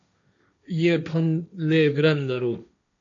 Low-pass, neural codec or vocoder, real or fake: 7.2 kHz; codec, 16 kHz, 1.1 kbps, Voila-Tokenizer; fake